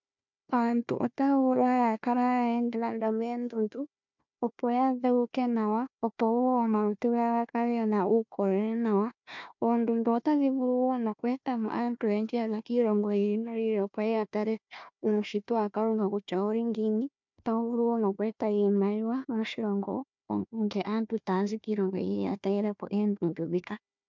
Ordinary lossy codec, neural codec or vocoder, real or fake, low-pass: MP3, 64 kbps; codec, 16 kHz, 1 kbps, FunCodec, trained on Chinese and English, 50 frames a second; fake; 7.2 kHz